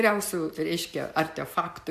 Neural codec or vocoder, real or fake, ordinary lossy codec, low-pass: none; real; MP3, 96 kbps; 14.4 kHz